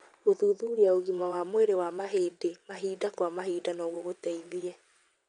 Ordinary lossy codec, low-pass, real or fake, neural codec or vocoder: none; 9.9 kHz; fake; vocoder, 22.05 kHz, 80 mel bands, WaveNeXt